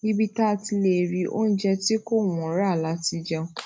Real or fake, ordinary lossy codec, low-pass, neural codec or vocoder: real; none; none; none